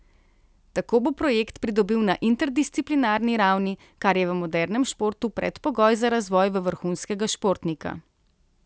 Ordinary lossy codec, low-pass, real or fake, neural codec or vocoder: none; none; real; none